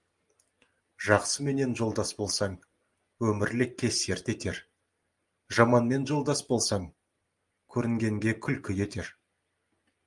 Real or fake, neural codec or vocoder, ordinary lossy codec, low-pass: real; none; Opus, 32 kbps; 10.8 kHz